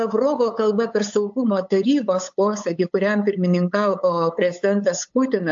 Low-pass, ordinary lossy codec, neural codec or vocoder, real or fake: 7.2 kHz; AAC, 64 kbps; codec, 16 kHz, 8 kbps, FunCodec, trained on LibriTTS, 25 frames a second; fake